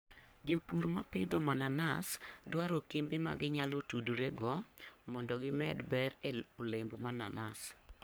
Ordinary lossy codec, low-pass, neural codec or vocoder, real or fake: none; none; codec, 44.1 kHz, 3.4 kbps, Pupu-Codec; fake